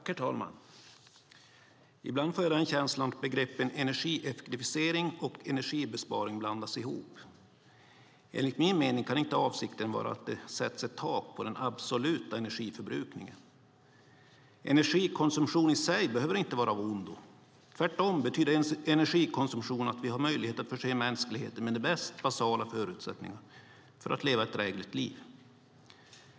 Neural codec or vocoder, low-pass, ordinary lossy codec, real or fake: none; none; none; real